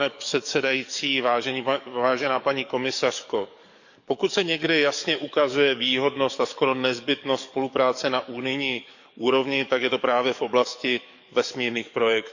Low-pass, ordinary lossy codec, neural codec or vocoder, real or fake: 7.2 kHz; none; codec, 44.1 kHz, 7.8 kbps, DAC; fake